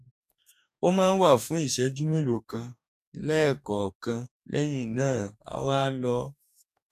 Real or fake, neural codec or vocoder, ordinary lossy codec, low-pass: fake; codec, 44.1 kHz, 2.6 kbps, DAC; none; 14.4 kHz